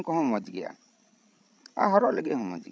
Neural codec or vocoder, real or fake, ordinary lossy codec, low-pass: codec, 16 kHz, 16 kbps, FreqCodec, larger model; fake; none; none